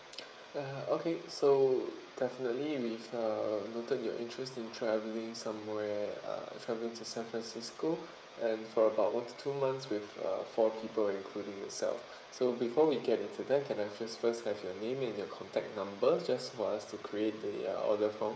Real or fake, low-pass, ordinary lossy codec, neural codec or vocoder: fake; none; none; codec, 16 kHz, 16 kbps, FreqCodec, smaller model